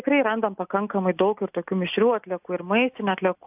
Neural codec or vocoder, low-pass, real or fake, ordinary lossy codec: none; 3.6 kHz; real; AAC, 32 kbps